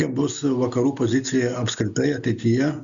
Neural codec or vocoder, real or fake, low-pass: none; real; 7.2 kHz